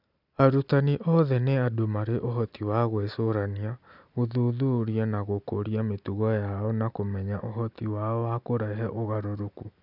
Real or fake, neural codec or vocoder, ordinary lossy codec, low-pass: real; none; none; 5.4 kHz